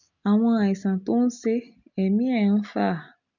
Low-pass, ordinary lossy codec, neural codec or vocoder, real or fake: 7.2 kHz; none; none; real